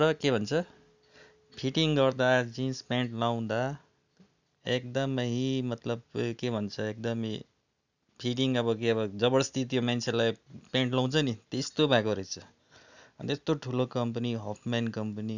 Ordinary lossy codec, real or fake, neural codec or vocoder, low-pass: none; real; none; 7.2 kHz